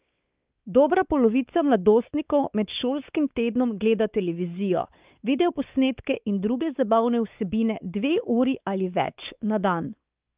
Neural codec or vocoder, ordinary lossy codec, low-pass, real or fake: codec, 16 kHz, 4 kbps, X-Codec, WavLM features, trained on Multilingual LibriSpeech; Opus, 24 kbps; 3.6 kHz; fake